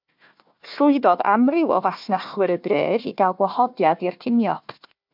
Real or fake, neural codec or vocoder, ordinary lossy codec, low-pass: fake; codec, 16 kHz, 1 kbps, FunCodec, trained on Chinese and English, 50 frames a second; MP3, 48 kbps; 5.4 kHz